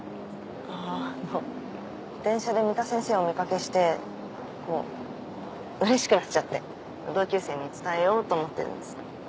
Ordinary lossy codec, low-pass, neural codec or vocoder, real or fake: none; none; none; real